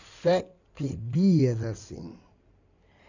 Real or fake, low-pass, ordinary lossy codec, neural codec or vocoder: fake; 7.2 kHz; none; codec, 16 kHz in and 24 kHz out, 2.2 kbps, FireRedTTS-2 codec